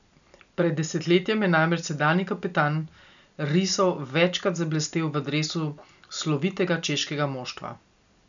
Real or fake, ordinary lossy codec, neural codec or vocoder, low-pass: real; none; none; 7.2 kHz